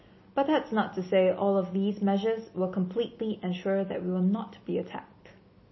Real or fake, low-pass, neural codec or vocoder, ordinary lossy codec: real; 7.2 kHz; none; MP3, 24 kbps